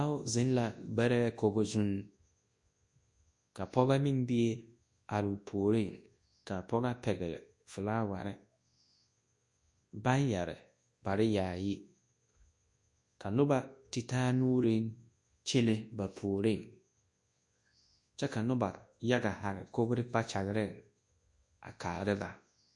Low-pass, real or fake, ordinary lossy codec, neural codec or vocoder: 10.8 kHz; fake; MP3, 48 kbps; codec, 24 kHz, 0.9 kbps, WavTokenizer, large speech release